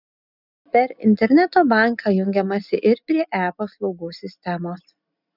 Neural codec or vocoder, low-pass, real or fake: none; 5.4 kHz; real